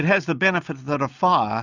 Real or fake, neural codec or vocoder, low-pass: real; none; 7.2 kHz